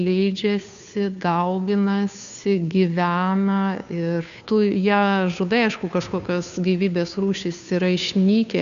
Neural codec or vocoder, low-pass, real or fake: codec, 16 kHz, 2 kbps, FunCodec, trained on Chinese and English, 25 frames a second; 7.2 kHz; fake